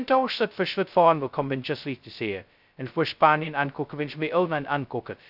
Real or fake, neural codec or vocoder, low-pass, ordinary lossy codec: fake; codec, 16 kHz, 0.2 kbps, FocalCodec; 5.4 kHz; none